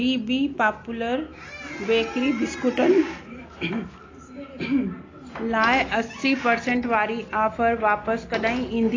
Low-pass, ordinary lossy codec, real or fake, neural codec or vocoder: 7.2 kHz; AAC, 32 kbps; real; none